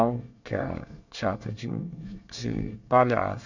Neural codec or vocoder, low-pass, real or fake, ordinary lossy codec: codec, 24 kHz, 1 kbps, SNAC; 7.2 kHz; fake; none